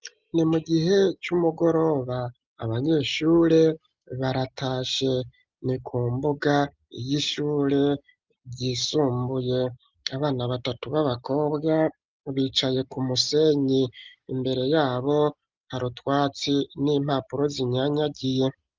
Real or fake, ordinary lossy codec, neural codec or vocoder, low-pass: real; Opus, 24 kbps; none; 7.2 kHz